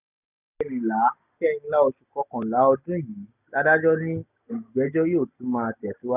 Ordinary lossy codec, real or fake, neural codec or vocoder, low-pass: none; real; none; 3.6 kHz